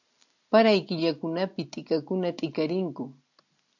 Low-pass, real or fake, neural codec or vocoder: 7.2 kHz; real; none